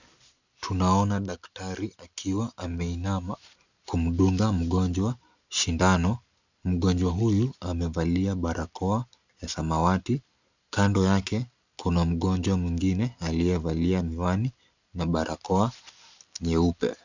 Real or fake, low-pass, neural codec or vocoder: real; 7.2 kHz; none